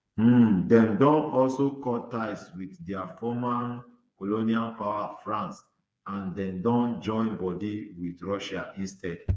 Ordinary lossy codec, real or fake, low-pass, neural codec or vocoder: none; fake; none; codec, 16 kHz, 4 kbps, FreqCodec, smaller model